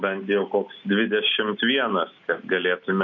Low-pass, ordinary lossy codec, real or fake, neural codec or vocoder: 7.2 kHz; MP3, 48 kbps; real; none